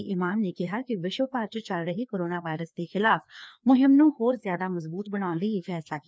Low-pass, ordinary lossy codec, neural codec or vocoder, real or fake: none; none; codec, 16 kHz, 2 kbps, FreqCodec, larger model; fake